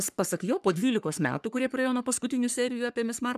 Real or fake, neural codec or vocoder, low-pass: fake; codec, 44.1 kHz, 3.4 kbps, Pupu-Codec; 14.4 kHz